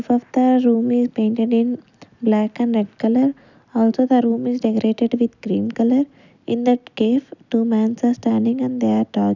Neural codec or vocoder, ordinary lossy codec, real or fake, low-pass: none; none; real; 7.2 kHz